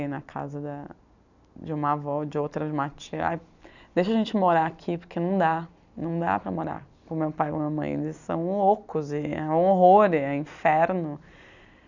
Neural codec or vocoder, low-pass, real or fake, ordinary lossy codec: none; 7.2 kHz; real; none